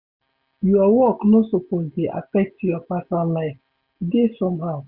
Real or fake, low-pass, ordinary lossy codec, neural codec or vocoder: real; 5.4 kHz; Opus, 64 kbps; none